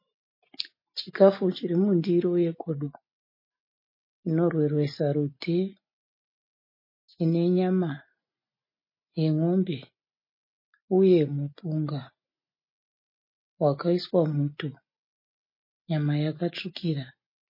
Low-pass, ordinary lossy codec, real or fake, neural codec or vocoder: 5.4 kHz; MP3, 24 kbps; real; none